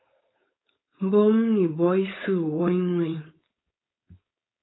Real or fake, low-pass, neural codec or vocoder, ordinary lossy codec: fake; 7.2 kHz; codec, 16 kHz, 4.8 kbps, FACodec; AAC, 16 kbps